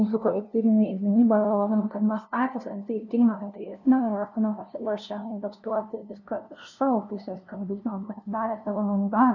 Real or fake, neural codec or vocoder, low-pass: fake; codec, 16 kHz, 0.5 kbps, FunCodec, trained on LibriTTS, 25 frames a second; 7.2 kHz